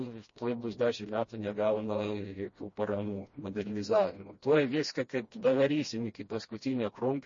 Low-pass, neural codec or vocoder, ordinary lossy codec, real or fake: 7.2 kHz; codec, 16 kHz, 1 kbps, FreqCodec, smaller model; MP3, 32 kbps; fake